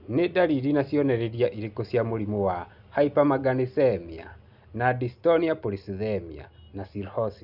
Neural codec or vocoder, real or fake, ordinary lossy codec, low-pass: none; real; none; 5.4 kHz